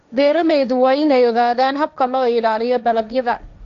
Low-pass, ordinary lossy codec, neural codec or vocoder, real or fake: 7.2 kHz; none; codec, 16 kHz, 1.1 kbps, Voila-Tokenizer; fake